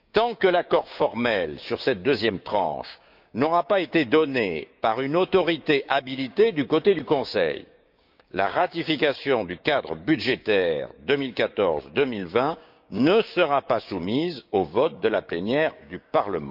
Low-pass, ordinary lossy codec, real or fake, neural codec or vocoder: 5.4 kHz; none; fake; codec, 16 kHz, 6 kbps, DAC